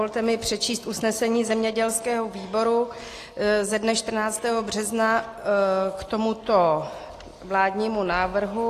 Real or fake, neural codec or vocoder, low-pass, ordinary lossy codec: real; none; 14.4 kHz; AAC, 48 kbps